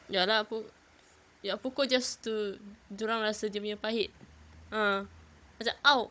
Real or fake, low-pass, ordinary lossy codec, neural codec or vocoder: fake; none; none; codec, 16 kHz, 16 kbps, FunCodec, trained on Chinese and English, 50 frames a second